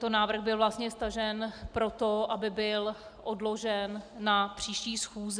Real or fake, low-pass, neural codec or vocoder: real; 9.9 kHz; none